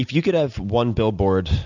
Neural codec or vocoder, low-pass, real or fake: none; 7.2 kHz; real